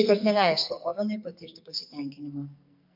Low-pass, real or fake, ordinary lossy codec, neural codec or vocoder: 5.4 kHz; fake; MP3, 48 kbps; codec, 44.1 kHz, 2.6 kbps, SNAC